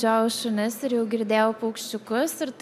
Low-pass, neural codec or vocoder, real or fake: 14.4 kHz; none; real